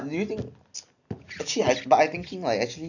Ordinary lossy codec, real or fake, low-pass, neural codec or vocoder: none; real; 7.2 kHz; none